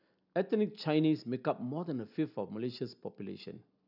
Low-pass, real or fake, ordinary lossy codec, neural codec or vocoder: 5.4 kHz; real; none; none